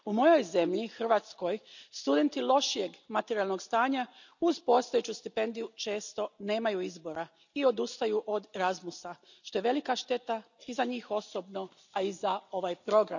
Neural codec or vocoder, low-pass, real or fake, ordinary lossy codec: none; 7.2 kHz; real; none